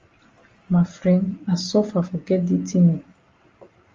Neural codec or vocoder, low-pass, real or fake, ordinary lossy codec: none; 7.2 kHz; real; Opus, 32 kbps